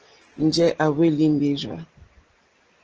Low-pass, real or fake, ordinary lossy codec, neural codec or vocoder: 7.2 kHz; real; Opus, 16 kbps; none